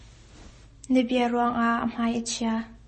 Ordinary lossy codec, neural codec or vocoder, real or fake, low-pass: MP3, 32 kbps; none; real; 10.8 kHz